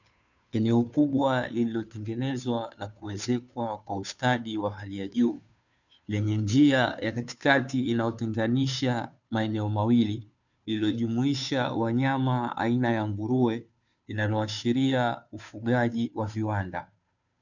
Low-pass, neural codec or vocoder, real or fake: 7.2 kHz; codec, 16 kHz, 2 kbps, FunCodec, trained on Chinese and English, 25 frames a second; fake